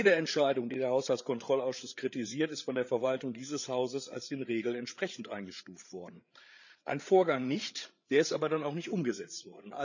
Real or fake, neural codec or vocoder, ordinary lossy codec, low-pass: fake; codec, 16 kHz, 8 kbps, FreqCodec, larger model; none; 7.2 kHz